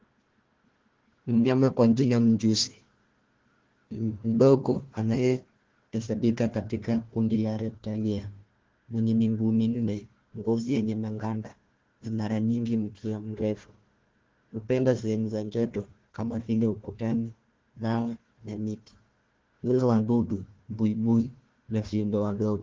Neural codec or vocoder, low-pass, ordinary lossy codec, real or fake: codec, 16 kHz, 1 kbps, FunCodec, trained on Chinese and English, 50 frames a second; 7.2 kHz; Opus, 16 kbps; fake